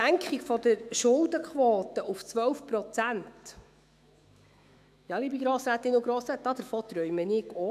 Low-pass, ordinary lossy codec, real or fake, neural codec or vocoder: 14.4 kHz; none; fake; autoencoder, 48 kHz, 128 numbers a frame, DAC-VAE, trained on Japanese speech